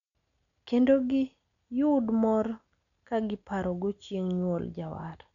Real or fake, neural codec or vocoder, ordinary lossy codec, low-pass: real; none; MP3, 96 kbps; 7.2 kHz